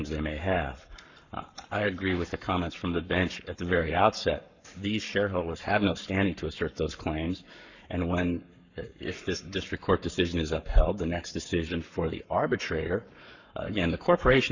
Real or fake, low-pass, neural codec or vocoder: fake; 7.2 kHz; codec, 44.1 kHz, 7.8 kbps, Pupu-Codec